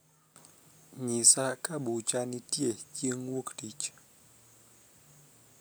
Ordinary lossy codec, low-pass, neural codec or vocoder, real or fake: none; none; none; real